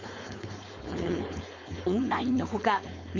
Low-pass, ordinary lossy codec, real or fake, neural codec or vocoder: 7.2 kHz; MP3, 48 kbps; fake; codec, 16 kHz, 4.8 kbps, FACodec